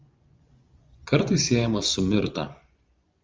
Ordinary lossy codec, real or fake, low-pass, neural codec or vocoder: Opus, 32 kbps; fake; 7.2 kHz; vocoder, 24 kHz, 100 mel bands, Vocos